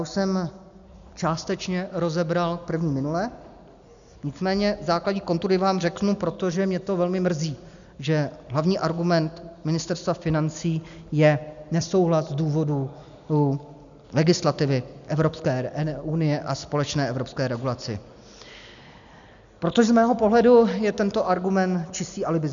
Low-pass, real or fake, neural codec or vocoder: 7.2 kHz; real; none